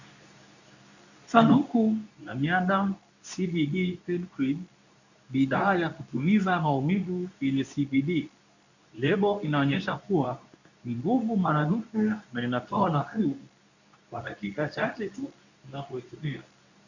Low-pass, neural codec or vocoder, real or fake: 7.2 kHz; codec, 24 kHz, 0.9 kbps, WavTokenizer, medium speech release version 2; fake